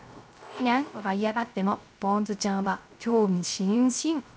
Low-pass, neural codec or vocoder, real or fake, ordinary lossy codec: none; codec, 16 kHz, 0.3 kbps, FocalCodec; fake; none